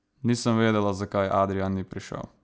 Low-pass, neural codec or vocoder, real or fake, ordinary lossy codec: none; none; real; none